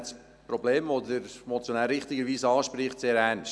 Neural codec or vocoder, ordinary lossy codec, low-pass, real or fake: none; none; none; real